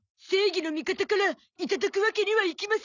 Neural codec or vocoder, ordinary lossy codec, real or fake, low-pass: none; none; real; 7.2 kHz